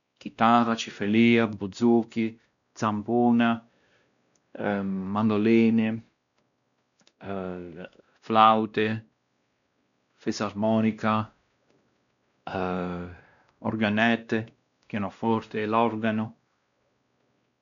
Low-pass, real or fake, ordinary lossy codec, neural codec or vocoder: 7.2 kHz; fake; none; codec, 16 kHz, 1 kbps, X-Codec, WavLM features, trained on Multilingual LibriSpeech